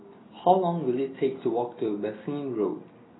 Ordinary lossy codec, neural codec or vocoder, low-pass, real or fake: AAC, 16 kbps; none; 7.2 kHz; real